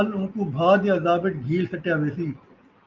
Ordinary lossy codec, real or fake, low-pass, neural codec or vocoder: Opus, 24 kbps; real; 7.2 kHz; none